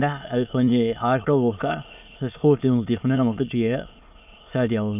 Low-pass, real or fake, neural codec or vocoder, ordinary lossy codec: 3.6 kHz; fake; autoencoder, 22.05 kHz, a latent of 192 numbers a frame, VITS, trained on many speakers; none